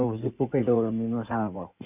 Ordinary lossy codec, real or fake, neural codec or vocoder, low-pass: none; fake; codec, 16 kHz in and 24 kHz out, 2.2 kbps, FireRedTTS-2 codec; 3.6 kHz